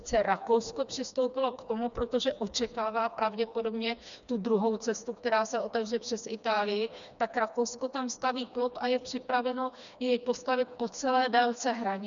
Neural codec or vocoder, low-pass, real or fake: codec, 16 kHz, 2 kbps, FreqCodec, smaller model; 7.2 kHz; fake